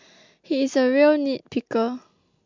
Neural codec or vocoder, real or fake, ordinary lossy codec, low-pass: none; real; MP3, 64 kbps; 7.2 kHz